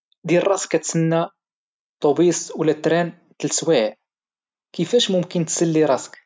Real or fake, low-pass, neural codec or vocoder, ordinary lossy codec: real; none; none; none